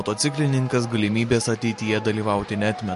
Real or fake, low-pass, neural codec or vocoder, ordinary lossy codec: real; 14.4 kHz; none; MP3, 48 kbps